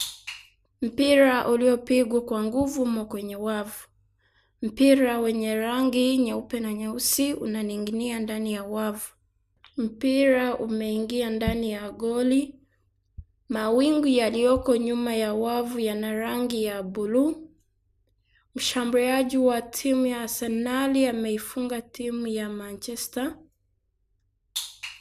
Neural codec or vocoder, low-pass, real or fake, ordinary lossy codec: none; 14.4 kHz; real; none